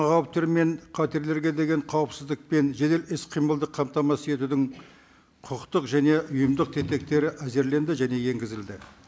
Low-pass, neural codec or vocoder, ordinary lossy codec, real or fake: none; none; none; real